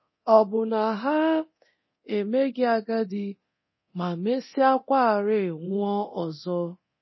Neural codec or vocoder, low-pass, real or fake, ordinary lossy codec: codec, 24 kHz, 0.9 kbps, DualCodec; 7.2 kHz; fake; MP3, 24 kbps